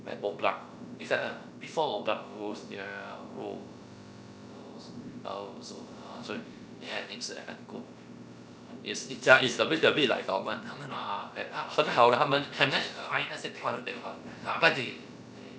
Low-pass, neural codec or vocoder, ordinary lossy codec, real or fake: none; codec, 16 kHz, about 1 kbps, DyCAST, with the encoder's durations; none; fake